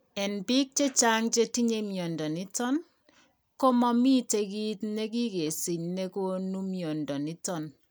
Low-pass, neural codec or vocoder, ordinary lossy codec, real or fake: none; none; none; real